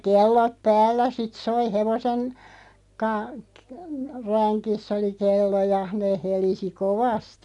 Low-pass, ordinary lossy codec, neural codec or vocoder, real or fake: 10.8 kHz; none; none; real